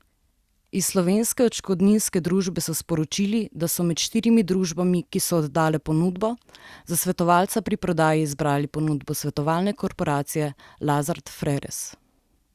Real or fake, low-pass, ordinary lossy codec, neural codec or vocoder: real; 14.4 kHz; Opus, 64 kbps; none